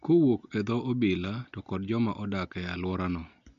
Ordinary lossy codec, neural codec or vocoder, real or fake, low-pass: MP3, 96 kbps; none; real; 7.2 kHz